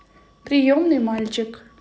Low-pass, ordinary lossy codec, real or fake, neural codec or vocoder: none; none; real; none